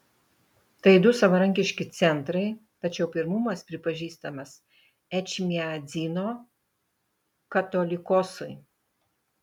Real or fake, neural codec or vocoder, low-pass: real; none; 19.8 kHz